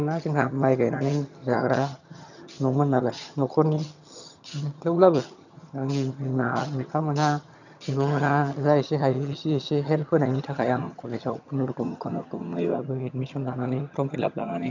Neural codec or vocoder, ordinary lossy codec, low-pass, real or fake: vocoder, 22.05 kHz, 80 mel bands, HiFi-GAN; none; 7.2 kHz; fake